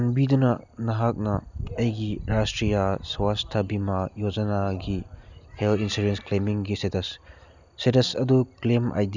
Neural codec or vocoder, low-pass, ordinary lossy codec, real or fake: none; 7.2 kHz; none; real